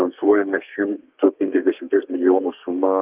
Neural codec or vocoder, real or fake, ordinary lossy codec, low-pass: codec, 32 kHz, 1.9 kbps, SNAC; fake; Opus, 32 kbps; 3.6 kHz